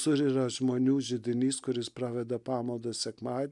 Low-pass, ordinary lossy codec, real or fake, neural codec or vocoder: 10.8 kHz; MP3, 96 kbps; real; none